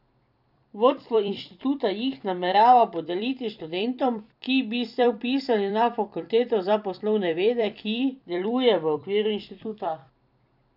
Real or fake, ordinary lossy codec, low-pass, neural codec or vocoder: fake; none; 5.4 kHz; vocoder, 44.1 kHz, 128 mel bands every 256 samples, BigVGAN v2